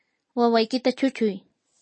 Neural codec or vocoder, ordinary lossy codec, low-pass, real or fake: none; MP3, 32 kbps; 9.9 kHz; real